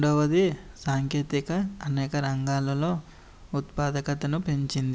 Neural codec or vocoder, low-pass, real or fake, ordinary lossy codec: none; none; real; none